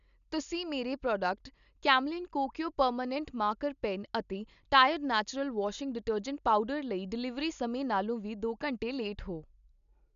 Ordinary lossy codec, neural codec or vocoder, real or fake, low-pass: none; none; real; 7.2 kHz